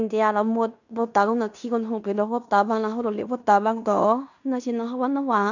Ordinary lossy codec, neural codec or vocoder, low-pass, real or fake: none; codec, 16 kHz in and 24 kHz out, 0.9 kbps, LongCat-Audio-Codec, fine tuned four codebook decoder; 7.2 kHz; fake